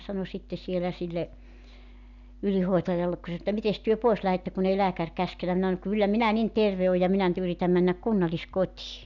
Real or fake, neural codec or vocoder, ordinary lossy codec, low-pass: real; none; none; 7.2 kHz